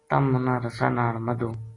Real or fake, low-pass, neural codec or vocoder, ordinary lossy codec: real; 10.8 kHz; none; AAC, 32 kbps